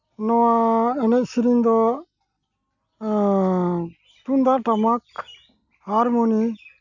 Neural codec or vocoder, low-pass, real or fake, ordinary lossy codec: none; 7.2 kHz; real; none